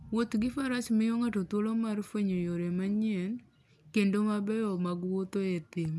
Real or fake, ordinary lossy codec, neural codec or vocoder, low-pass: real; none; none; none